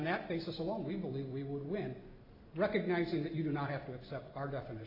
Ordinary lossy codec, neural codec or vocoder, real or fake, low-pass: AAC, 32 kbps; none; real; 5.4 kHz